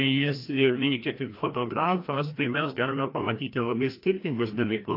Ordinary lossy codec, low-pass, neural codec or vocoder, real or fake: AAC, 32 kbps; 5.4 kHz; codec, 16 kHz, 1 kbps, FreqCodec, larger model; fake